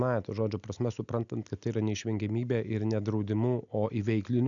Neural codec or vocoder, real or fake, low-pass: none; real; 7.2 kHz